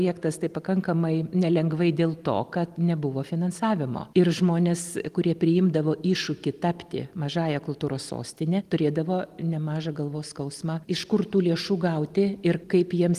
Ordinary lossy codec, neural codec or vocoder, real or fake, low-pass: Opus, 24 kbps; none; real; 14.4 kHz